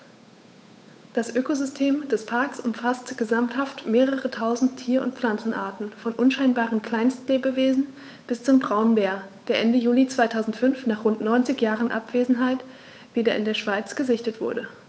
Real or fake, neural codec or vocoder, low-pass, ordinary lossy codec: fake; codec, 16 kHz, 8 kbps, FunCodec, trained on Chinese and English, 25 frames a second; none; none